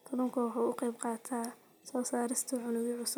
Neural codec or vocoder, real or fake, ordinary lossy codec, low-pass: none; real; none; none